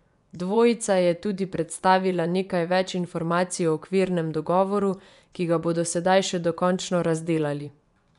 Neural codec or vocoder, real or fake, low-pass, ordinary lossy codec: vocoder, 24 kHz, 100 mel bands, Vocos; fake; 10.8 kHz; none